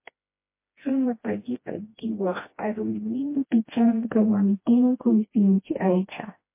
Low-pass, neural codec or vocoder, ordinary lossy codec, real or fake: 3.6 kHz; codec, 16 kHz, 1 kbps, FreqCodec, smaller model; MP3, 24 kbps; fake